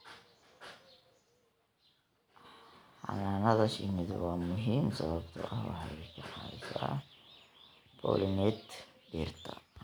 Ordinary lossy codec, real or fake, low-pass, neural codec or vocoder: none; real; none; none